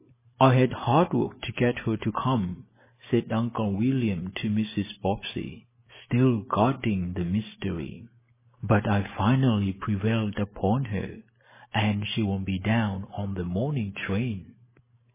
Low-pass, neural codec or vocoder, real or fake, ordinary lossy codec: 3.6 kHz; none; real; MP3, 16 kbps